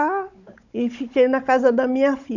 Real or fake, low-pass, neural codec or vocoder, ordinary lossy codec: fake; 7.2 kHz; codec, 16 kHz, 16 kbps, FunCodec, trained on Chinese and English, 50 frames a second; none